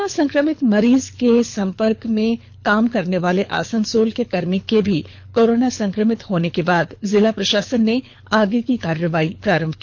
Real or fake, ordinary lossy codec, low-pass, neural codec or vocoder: fake; none; 7.2 kHz; codec, 24 kHz, 6 kbps, HILCodec